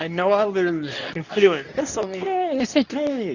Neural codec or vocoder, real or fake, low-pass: codec, 24 kHz, 0.9 kbps, WavTokenizer, medium speech release version 2; fake; 7.2 kHz